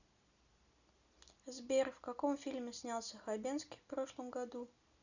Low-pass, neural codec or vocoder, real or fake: 7.2 kHz; none; real